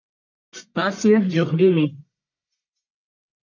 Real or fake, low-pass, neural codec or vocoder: fake; 7.2 kHz; codec, 44.1 kHz, 1.7 kbps, Pupu-Codec